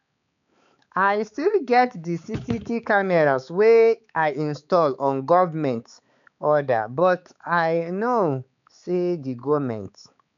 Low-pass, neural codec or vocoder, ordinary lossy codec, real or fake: 7.2 kHz; codec, 16 kHz, 4 kbps, X-Codec, HuBERT features, trained on balanced general audio; none; fake